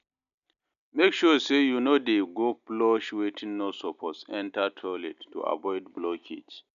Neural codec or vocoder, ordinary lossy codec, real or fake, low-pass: none; none; real; 7.2 kHz